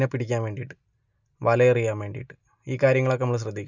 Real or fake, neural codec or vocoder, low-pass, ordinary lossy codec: real; none; 7.2 kHz; none